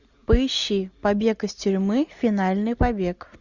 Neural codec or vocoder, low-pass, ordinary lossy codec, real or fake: none; 7.2 kHz; Opus, 64 kbps; real